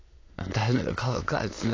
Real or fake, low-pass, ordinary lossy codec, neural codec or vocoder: fake; 7.2 kHz; AAC, 32 kbps; autoencoder, 22.05 kHz, a latent of 192 numbers a frame, VITS, trained on many speakers